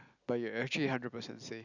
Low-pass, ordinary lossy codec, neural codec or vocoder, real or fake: 7.2 kHz; none; none; real